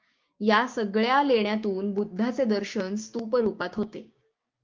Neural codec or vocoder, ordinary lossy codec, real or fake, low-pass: codec, 16 kHz, 6 kbps, DAC; Opus, 24 kbps; fake; 7.2 kHz